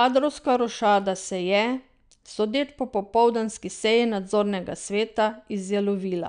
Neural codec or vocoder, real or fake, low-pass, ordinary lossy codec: none; real; 9.9 kHz; none